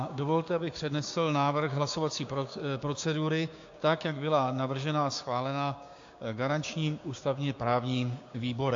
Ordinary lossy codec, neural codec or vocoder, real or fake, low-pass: AAC, 48 kbps; codec, 16 kHz, 6 kbps, DAC; fake; 7.2 kHz